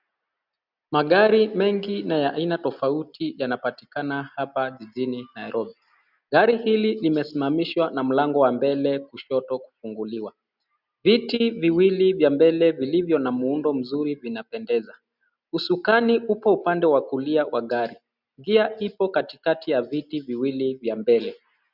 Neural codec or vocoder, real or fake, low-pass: none; real; 5.4 kHz